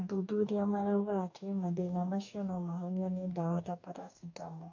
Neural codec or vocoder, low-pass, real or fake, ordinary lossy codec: codec, 44.1 kHz, 2.6 kbps, DAC; 7.2 kHz; fake; none